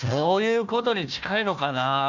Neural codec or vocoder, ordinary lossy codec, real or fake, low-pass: codec, 16 kHz, 1 kbps, FunCodec, trained on Chinese and English, 50 frames a second; none; fake; 7.2 kHz